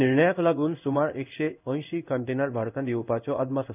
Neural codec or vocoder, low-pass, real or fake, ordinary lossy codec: codec, 16 kHz in and 24 kHz out, 1 kbps, XY-Tokenizer; 3.6 kHz; fake; none